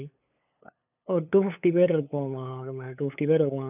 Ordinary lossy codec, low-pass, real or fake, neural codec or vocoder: none; 3.6 kHz; fake; codec, 16 kHz, 8 kbps, FunCodec, trained on LibriTTS, 25 frames a second